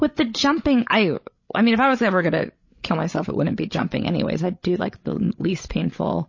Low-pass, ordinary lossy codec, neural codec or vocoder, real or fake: 7.2 kHz; MP3, 32 kbps; codec, 16 kHz, 16 kbps, FreqCodec, larger model; fake